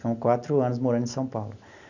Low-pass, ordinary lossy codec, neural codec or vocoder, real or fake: 7.2 kHz; none; none; real